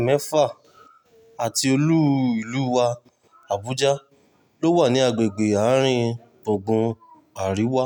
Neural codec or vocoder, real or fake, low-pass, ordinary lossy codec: none; real; 19.8 kHz; none